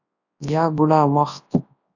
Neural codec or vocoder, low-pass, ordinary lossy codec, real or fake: codec, 24 kHz, 0.9 kbps, WavTokenizer, large speech release; 7.2 kHz; AAC, 48 kbps; fake